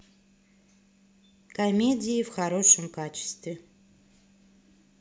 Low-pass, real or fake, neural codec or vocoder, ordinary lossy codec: none; real; none; none